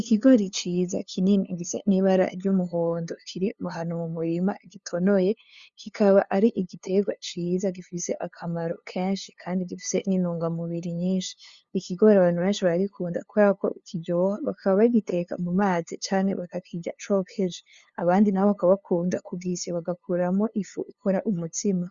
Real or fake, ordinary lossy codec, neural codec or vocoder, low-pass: fake; Opus, 64 kbps; codec, 16 kHz, 2 kbps, FunCodec, trained on LibriTTS, 25 frames a second; 7.2 kHz